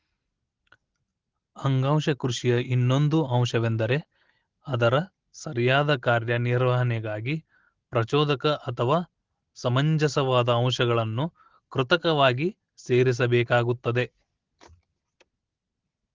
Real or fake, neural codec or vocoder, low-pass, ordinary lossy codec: real; none; 7.2 kHz; Opus, 16 kbps